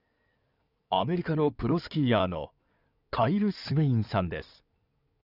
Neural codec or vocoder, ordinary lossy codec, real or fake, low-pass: codec, 16 kHz in and 24 kHz out, 2.2 kbps, FireRedTTS-2 codec; none; fake; 5.4 kHz